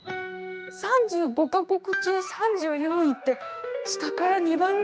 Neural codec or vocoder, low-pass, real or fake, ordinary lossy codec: codec, 16 kHz, 2 kbps, X-Codec, HuBERT features, trained on general audio; none; fake; none